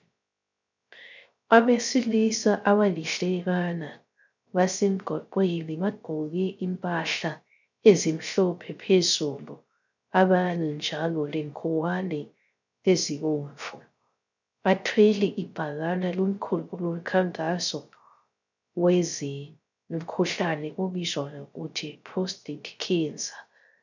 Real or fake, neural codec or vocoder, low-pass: fake; codec, 16 kHz, 0.3 kbps, FocalCodec; 7.2 kHz